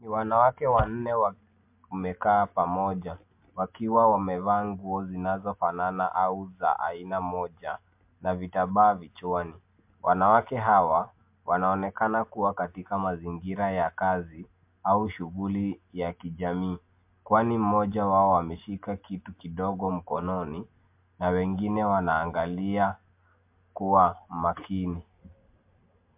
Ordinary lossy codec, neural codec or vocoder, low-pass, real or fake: MP3, 32 kbps; none; 3.6 kHz; real